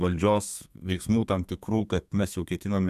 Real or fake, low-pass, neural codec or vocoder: fake; 14.4 kHz; codec, 32 kHz, 1.9 kbps, SNAC